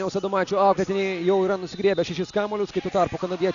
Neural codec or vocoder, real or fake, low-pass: none; real; 7.2 kHz